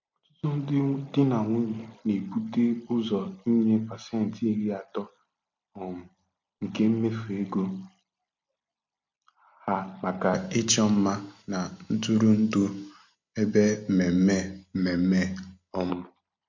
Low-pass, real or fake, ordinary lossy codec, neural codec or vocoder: 7.2 kHz; real; MP3, 48 kbps; none